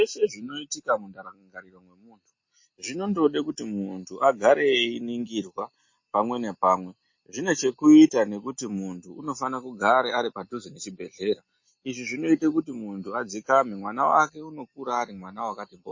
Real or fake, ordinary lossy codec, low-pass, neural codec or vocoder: real; MP3, 32 kbps; 7.2 kHz; none